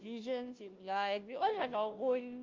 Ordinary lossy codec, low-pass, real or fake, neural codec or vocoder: Opus, 24 kbps; 7.2 kHz; fake; codec, 16 kHz, 0.5 kbps, FunCodec, trained on Chinese and English, 25 frames a second